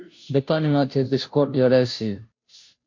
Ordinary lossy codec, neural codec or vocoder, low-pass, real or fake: MP3, 48 kbps; codec, 16 kHz, 0.5 kbps, FunCodec, trained on Chinese and English, 25 frames a second; 7.2 kHz; fake